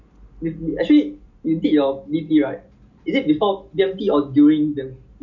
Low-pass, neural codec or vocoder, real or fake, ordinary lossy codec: 7.2 kHz; none; real; none